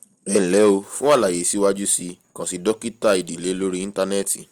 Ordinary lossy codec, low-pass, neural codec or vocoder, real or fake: Opus, 32 kbps; 19.8 kHz; none; real